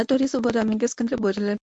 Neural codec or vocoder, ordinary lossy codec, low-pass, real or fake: codec, 24 kHz, 0.9 kbps, WavTokenizer, medium speech release version 1; MP3, 64 kbps; 10.8 kHz; fake